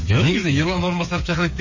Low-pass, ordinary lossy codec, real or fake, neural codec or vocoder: 7.2 kHz; MP3, 32 kbps; fake; codec, 16 kHz, 8 kbps, FreqCodec, smaller model